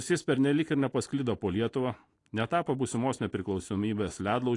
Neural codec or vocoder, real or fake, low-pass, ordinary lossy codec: none; real; 10.8 kHz; AAC, 48 kbps